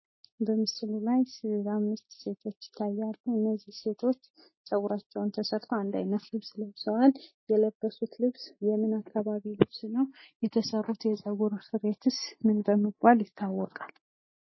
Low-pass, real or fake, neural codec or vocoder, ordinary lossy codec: 7.2 kHz; real; none; MP3, 24 kbps